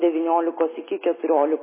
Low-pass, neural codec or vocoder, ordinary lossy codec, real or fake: 3.6 kHz; none; MP3, 16 kbps; real